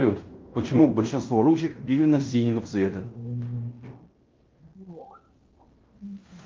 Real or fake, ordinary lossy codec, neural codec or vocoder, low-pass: fake; Opus, 32 kbps; codec, 16 kHz in and 24 kHz out, 0.9 kbps, LongCat-Audio-Codec, fine tuned four codebook decoder; 7.2 kHz